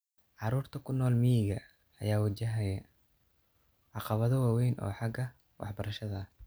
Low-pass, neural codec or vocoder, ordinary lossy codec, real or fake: none; none; none; real